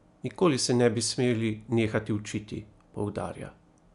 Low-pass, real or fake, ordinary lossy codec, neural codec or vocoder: 10.8 kHz; real; none; none